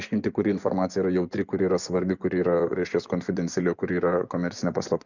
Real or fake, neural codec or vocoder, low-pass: fake; vocoder, 24 kHz, 100 mel bands, Vocos; 7.2 kHz